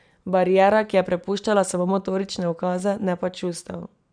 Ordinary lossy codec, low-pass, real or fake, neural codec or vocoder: none; 9.9 kHz; real; none